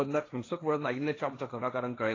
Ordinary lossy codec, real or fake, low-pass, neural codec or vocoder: AAC, 32 kbps; fake; 7.2 kHz; codec, 16 kHz, 1.1 kbps, Voila-Tokenizer